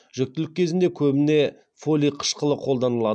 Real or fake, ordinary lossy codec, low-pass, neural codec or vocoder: real; none; 9.9 kHz; none